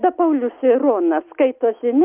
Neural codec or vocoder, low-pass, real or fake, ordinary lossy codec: none; 3.6 kHz; real; Opus, 24 kbps